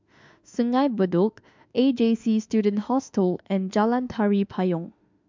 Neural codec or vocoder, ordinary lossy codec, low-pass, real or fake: autoencoder, 48 kHz, 32 numbers a frame, DAC-VAE, trained on Japanese speech; MP3, 64 kbps; 7.2 kHz; fake